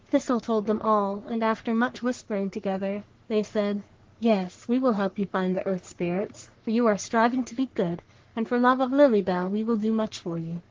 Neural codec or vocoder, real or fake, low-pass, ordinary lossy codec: codec, 44.1 kHz, 3.4 kbps, Pupu-Codec; fake; 7.2 kHz; Opus, 16 kbps